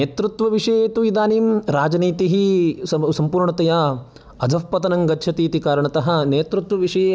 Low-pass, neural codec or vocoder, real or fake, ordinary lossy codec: none; none; real; none